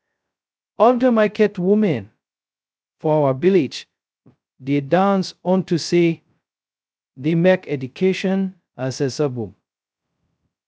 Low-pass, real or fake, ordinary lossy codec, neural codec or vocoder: none; fake; none; codec, 16 kHz, 0.2 kbps, FocalCodec